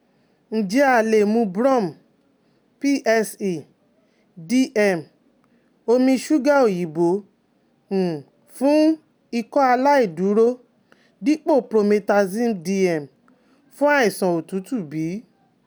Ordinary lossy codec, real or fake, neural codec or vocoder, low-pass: none; real; none; none